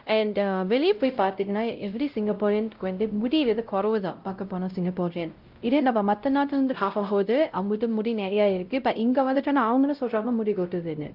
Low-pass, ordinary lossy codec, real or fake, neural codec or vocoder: 5.4 kHz; Opus, 24 kbps; fake; codec, 16 kHz, 0.5 kbps, X-Codec, WavLM features, trained on Multilingual LibriSpeech